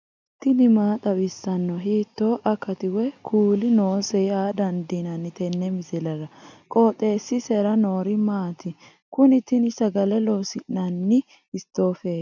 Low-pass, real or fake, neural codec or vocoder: 7.2 kHz; real; none